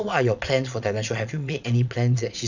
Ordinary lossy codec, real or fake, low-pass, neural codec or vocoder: none; fake; 7.2 kHz; vocoder, 22.05 kHz, 80 mel bands, Vocos